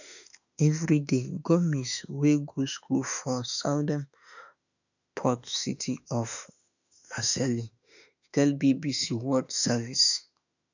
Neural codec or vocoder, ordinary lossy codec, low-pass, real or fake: autoencoder, 48 kHz, 32 numbers a frame, DAC-VAE, trained on Japanese speech; none; 7.2 kHz; fake